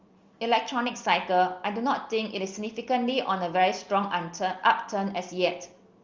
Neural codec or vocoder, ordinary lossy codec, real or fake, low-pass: none; Opus, 32 kbps; real; 7.2 kHz